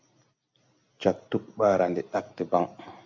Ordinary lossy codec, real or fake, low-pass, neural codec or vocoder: MP3, 64 kbps; real; 7.2 kHz; none